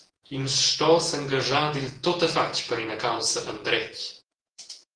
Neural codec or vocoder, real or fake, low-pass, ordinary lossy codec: vocoder, 48 kHz, 128 mel bands, Vocos; fake; 9.9 kHz; Opus, 16 kbps